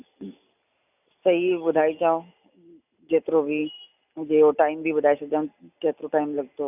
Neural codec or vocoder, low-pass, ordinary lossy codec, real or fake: none; 3.6 kHz; none; real